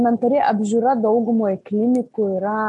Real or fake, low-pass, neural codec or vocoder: real; 10.8 kHz; none